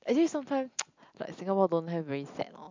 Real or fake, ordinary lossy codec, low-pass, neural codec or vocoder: real; MP3, 64 kbps; 7.2 kHz; none